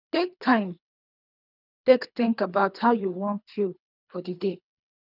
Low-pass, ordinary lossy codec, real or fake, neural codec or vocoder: 5.4 kHz; none; fake; codec, 24 kHz, 3 kbps, HILCodec